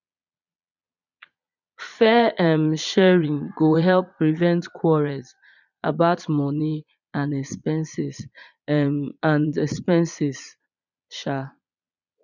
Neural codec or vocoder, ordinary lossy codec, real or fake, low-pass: vocoder, 22.05 kHz, 80 mel bands, Vocos; none; fake; 7.2 kHz